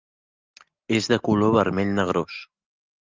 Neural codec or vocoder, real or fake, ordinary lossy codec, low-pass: none; real; Opus, 24 kbps; 7.2 kHz